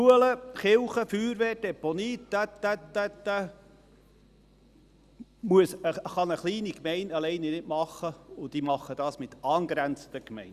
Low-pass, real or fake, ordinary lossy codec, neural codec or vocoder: 14.4 kHz; real; Opus, 64 kbps; none